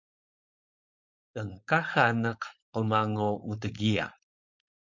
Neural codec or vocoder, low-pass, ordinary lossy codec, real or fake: codec, 16 kHz, 4.8 kbps, FACodec; 7.2 kHz; MP3, 64 kbps; fake